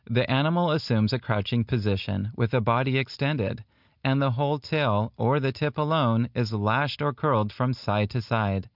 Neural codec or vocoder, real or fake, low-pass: none; real; 5.4 kHz